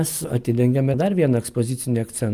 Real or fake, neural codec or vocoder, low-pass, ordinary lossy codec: real; none; 14.4 kHz; Opus, 32 kbps